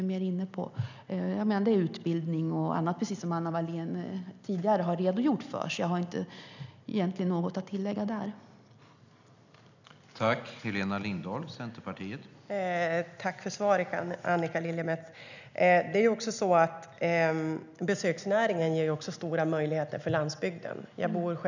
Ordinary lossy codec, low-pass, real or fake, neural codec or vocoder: none; 7.2 kHz; real; none